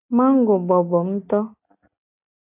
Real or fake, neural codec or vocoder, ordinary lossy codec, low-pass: real; none; AAC, 32 kbps; 3.6 kHz